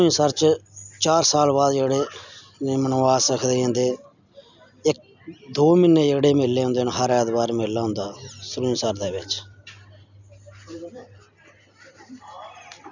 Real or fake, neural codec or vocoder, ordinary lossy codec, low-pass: real; none; none; 7.2 kHz